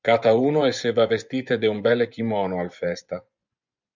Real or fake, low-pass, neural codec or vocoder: fake; 7.2 kHz; vocoder, 24 kHz, 100 mel bands, Vocos